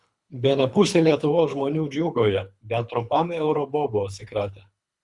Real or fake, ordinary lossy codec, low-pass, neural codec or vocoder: fake; Opus, 64 kbps; 10.8 kHz; codec, 24 kHz, 3 kbps, HILCodec